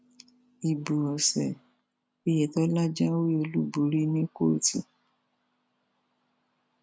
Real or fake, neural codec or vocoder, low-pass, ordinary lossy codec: real; none; none; none